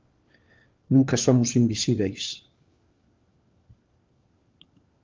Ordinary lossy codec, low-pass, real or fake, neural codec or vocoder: Opus, 24 kbps; 7.2 kHz; fake; codec, 16 kHz, 4 kbps, FunCodec, trained on LibriTTS, 50 frames a second